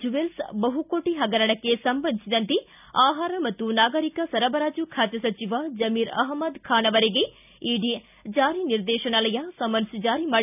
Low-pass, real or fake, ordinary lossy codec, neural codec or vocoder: 3.6 kHz; real; none; none